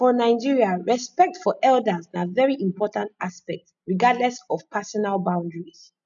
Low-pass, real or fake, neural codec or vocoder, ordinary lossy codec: 7.2 kHz; real; none; none